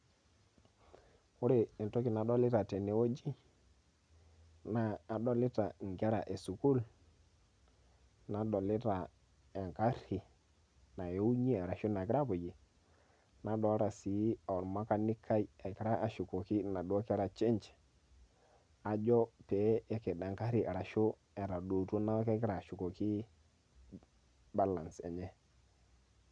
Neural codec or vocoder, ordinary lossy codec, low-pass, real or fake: none; none; 9.9 kHz; real